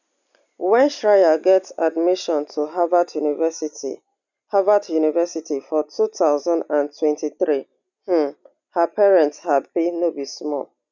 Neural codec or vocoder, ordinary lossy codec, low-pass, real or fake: none; none; 7.2 kHz; real